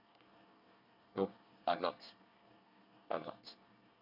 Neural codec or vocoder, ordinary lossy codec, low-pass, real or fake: codec, 24 kHz, 1 kbps, SNAC; none; 5.4 kHz; fake